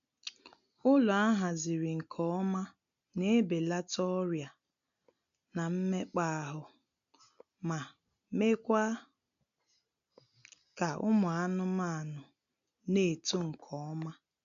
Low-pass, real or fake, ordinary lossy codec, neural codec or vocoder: 7.2 kHz; real; none; none